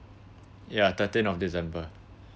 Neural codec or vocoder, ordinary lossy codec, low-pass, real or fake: none; none; none; real